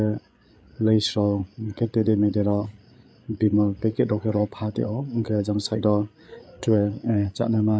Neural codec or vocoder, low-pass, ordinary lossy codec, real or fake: codec, 16 kHz, 8 kbps, FreqCodec, larger model; none; none; fake